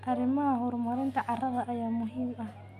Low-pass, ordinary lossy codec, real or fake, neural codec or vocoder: 14.4 kHz; Opus, 64 kbps; real; none